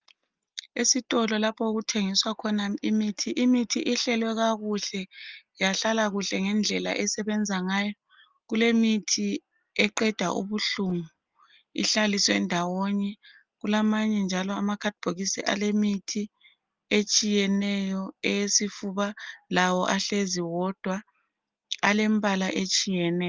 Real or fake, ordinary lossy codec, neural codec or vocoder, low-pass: real; Opus, 32 kbps; none; 7.2 kHz